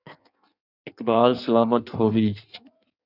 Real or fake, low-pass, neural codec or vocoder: fake; 5.4 kHz; codec, 16 kHz in and 24 kHz out, 1.1 kbps, FireRedTTS-2 codec